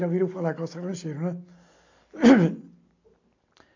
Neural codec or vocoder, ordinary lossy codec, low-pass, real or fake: none; AAC, 48 kbps; 7.2 kHz; real